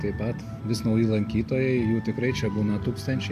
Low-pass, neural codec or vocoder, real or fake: 14.4 kHz; none; real